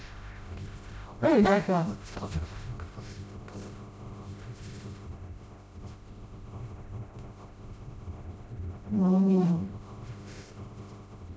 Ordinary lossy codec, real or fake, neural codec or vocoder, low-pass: none; fake; codec, 16 kHz, 0.5 kbps, FreqCodec, smaller model; none